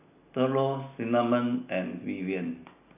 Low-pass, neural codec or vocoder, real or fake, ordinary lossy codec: 3.6 kHz; none; real; none